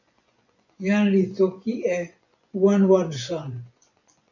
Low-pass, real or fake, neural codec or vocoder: 7.2 kHz; fake; vocoder, 24 kHz, 100 mel bands, Vocos